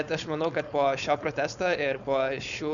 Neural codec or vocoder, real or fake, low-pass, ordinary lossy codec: codec, 16 kHz, 4.8 kbps, FACodec; fake; 7.2 kHz; MP3, 96 kbps